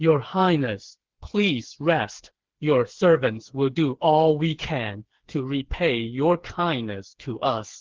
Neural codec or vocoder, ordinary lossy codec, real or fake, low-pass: codec, 16 kHz, 4 kbps, FreqCodec, smaller model; Opus, 16 kbps; fake; 7.2 kHz